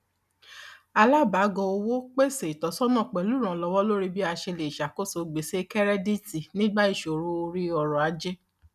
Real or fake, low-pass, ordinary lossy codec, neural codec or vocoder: real; 14.4 kHz; none; none